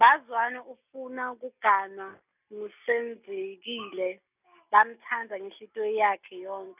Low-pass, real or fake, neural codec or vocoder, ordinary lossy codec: 3.6 kHz; real; none; none